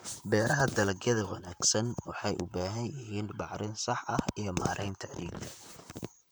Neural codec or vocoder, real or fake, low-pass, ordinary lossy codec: vocoder, 44.1 kHz, 128 mel bands, Pupu-Vocoder; fake; none; none